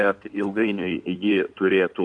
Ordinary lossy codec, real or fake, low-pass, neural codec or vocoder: MP3, 64 kbps; fake; 9.9 kHz; codec, 16 kHz in and 24 kHz out, 2.2 kbps, FireRedTTS-2 codec